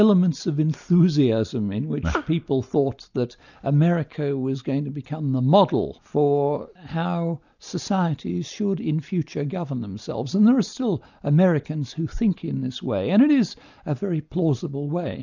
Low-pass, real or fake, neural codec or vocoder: 7.2 kHz; real; none